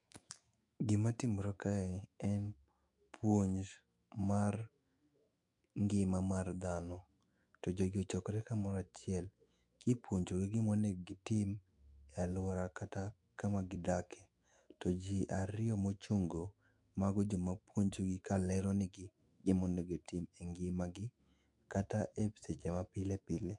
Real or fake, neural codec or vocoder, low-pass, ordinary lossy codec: fake; autoencoder, 48 kHz, 128 numbers a frame, DAC-VAE, trained on Japanese speech; 10.8 kHz; MP3, 64 kbps